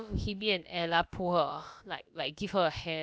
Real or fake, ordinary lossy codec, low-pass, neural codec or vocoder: fake; none; none; codec, 16 kHz, about 1 kbps, DyCAST, with the encoder's durations